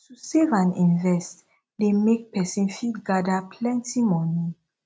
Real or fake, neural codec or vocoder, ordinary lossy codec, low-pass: real; none; none; none